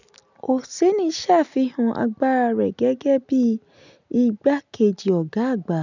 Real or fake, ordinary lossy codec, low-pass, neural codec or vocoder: real; none; 7.2 kHz; none